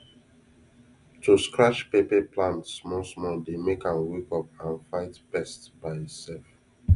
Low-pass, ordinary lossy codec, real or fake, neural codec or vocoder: 10.8 kHz; none; real; none